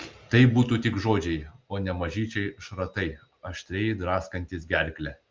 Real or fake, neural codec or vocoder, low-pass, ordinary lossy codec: real; none; 7.2 kHz; Opus, 32 kbps